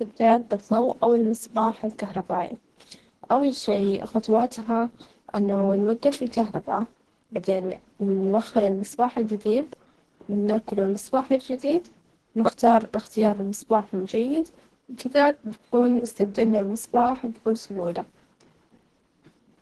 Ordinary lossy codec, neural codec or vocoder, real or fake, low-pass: Opus, 16 kbps; codec, 24 kHz, 1.5 kbps, HILCodec; fake; 10.8 kHz